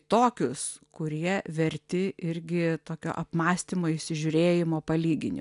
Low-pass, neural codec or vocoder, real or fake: 10.8 kHz; none; real